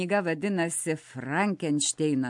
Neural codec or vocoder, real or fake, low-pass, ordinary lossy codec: none; real; 10.8 kHz; MP3, 64 kbps